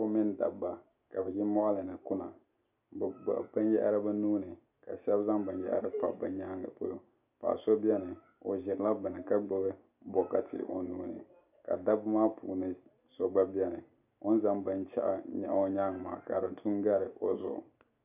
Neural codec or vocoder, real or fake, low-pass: none; real; 3.6 kHz